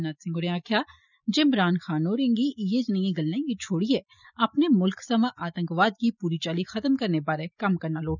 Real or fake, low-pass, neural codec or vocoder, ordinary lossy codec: real; 7.2 kHz; none; none